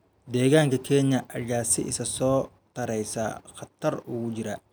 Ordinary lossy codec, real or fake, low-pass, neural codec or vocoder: none; real; none; none